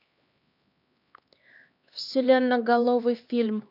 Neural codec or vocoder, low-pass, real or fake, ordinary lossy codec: codec, 16 kHz, 2 kbps, X-Codec, HuBERT features, trained on LibriSpeech; 5.4 kHz; fake; none